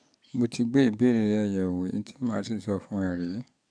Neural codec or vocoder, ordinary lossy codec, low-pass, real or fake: codec, 44.1 kHz, 7.8 kbps, DAC; none; 9.9 kHz; fake